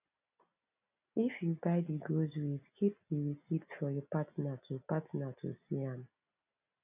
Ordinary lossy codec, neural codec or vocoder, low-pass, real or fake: none; none; 3.6 kHz; real